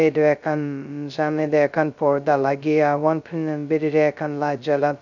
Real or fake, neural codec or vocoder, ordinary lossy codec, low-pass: fake; codec, 16 kHz, 0.2 kbps, FocalCodec; none; 7.2 kHz